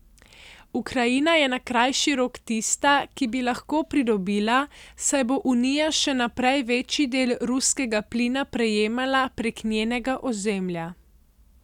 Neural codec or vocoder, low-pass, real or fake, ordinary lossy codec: none; 19.8 kHz; real; none